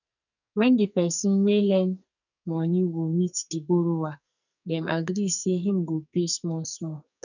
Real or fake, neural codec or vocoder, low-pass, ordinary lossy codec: fake; codec, 44.1 kHz, 2.6 kbps, SNAC; 7.2 kHz; none